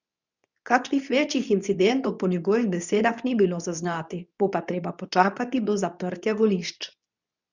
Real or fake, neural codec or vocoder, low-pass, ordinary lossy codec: fake; codec, 24 kHz, 0.9 kbps, WavTokenizer, medium speech release version 2; 7.2 kHz; none